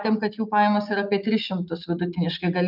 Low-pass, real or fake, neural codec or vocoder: 5.4 kHz; real; none